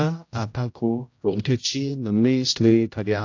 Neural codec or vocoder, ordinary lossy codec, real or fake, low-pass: codec, 16 kHz, 0.5 kbps, X-Codec, HuBERT features, trained on general audio; AAC, 48 kbps; fake; 7.2 kHz